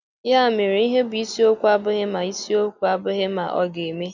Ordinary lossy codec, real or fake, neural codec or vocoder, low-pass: none; real; none; 7.2 kHz